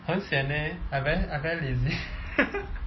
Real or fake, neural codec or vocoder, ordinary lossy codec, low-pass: real; none; MP3, 24 kbps; 7.2 kHz